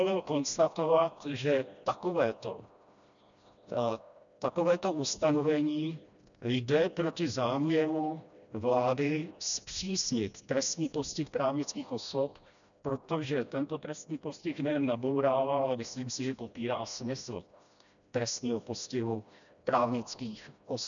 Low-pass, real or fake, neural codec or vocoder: 7.2 kHz; fake; codec, 16 kHz, 1 kbps, FreqCodec, smaller model